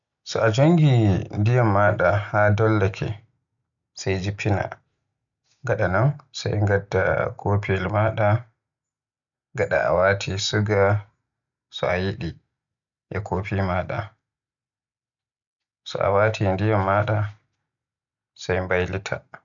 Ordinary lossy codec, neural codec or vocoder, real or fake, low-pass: none; none; real; 7.2 kHz